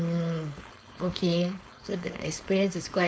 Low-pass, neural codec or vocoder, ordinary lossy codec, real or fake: none; codec, 16 kHz, 4.8 kbps, FACodec; none; fake